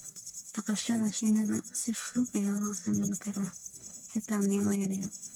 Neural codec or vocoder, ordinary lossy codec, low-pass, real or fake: codec, 44.1 kHz, 1.7 kbps, Pupu-Codec; none; none; fake